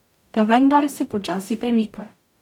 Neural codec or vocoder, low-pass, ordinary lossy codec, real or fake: codec, 44.1 kHz, 0.9 kbps, DAC; 19.8 kHz; none; fake